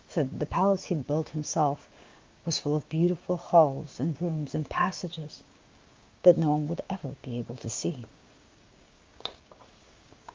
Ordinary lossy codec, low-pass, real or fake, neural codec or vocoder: Opus, 24 kbps; 7.2 kHz; fake; codec, 16 kHz, 6 kbps, DAC